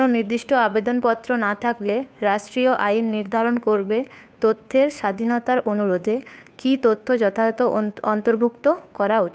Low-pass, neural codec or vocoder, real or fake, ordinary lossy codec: none; codec, 16 kHz, 2 kbps, FunCodec, trained on Chinese and English, 25 frames a second; fake; none